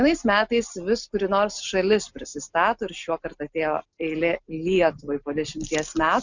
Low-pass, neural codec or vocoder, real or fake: 7.2 kHz; none; real